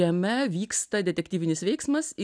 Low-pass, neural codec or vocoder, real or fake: 9.9 kHz; none; real